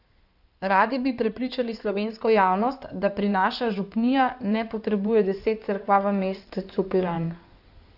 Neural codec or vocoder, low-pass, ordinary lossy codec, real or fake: codec, 16 kHz in and 24 kHz out, 2.2 kbps, FireRedTTS-2 codec; 5.4 kHz; none; fake